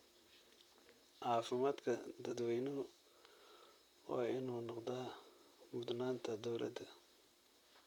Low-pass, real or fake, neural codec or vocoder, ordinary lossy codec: 19.8 kHz; fake; vocoder, 44.1 kHz, 128 mel bands, Pupu-Vocoder; none